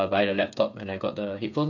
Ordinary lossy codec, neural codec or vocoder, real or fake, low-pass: none; codec, 16 kHz, 8 kbps, FreqCodec, smaller model; fake; 7.2 kHz